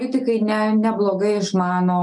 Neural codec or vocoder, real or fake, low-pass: none; real; 10.8 kHz